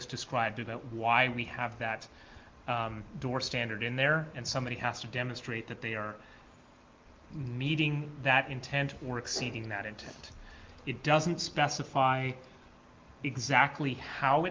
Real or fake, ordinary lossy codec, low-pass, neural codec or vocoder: real; Opus, 32 kbps; 7.2 kHz; none